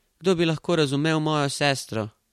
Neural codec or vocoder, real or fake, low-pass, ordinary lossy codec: none; real; 19.8 kHz; MP3, 64 kbps